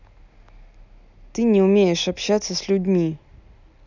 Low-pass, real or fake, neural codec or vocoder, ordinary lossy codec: 7.2 kHz; real; none; none